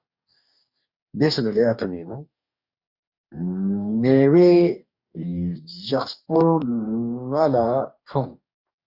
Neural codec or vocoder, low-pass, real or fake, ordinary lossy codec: codec, 44.1 kHz, 2.6 kbps, DAC; 5.4 kHz; fake; Opus, 64 kbps